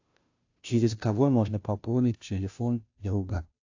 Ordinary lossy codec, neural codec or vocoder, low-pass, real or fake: AAC, 48 kbps; codec, 16 kHz, 0.5 kbps, FunCodec, trained on Chinese and English, 25 frames a second; 7.2 kHz; fake